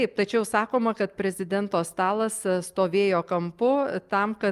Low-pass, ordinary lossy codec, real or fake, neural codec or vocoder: 14.4 kHz; Opus, 32 kbps; real; none